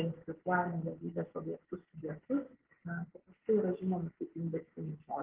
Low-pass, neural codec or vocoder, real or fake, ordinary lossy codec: 3.6 kHz; none; real; Opus, 16 kbps